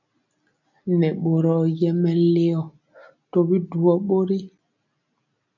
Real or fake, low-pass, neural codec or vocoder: real; 7.2 kHz; none